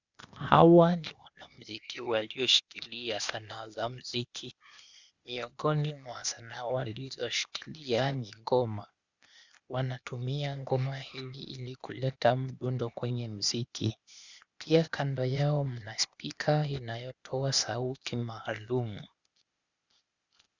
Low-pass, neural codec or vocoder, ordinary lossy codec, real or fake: 7.2 kHz; codec, 16 kHz, 0.8 kbps, ZipCodec; Opus, 64 kbps; fake